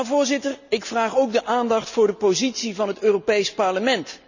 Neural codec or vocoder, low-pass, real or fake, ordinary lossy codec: none; 7.2 kHz; real; none